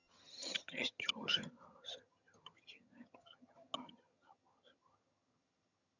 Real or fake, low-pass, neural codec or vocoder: fake; 7.2 kHz; vocoder, 22.05 kHz, 80 mel bands, HiFi-GAN